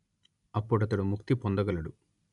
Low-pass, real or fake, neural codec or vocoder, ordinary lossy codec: 10.8 kHz; real; none; none